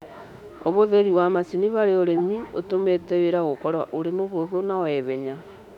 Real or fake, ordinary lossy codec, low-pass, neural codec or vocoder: fake; none; 19.8 kHz; autoencoder, 48 kHz, 32 numbers a frame, DAC-VAE, trained on Japanese speech